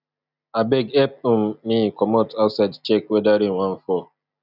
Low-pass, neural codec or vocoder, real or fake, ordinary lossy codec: 5.4 kHz; none; real; none